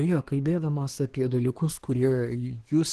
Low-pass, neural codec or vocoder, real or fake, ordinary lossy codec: 10.8 kHz; codec, 24 kHz, 1 kbps, SNAC; fake; Opus, 16 kbps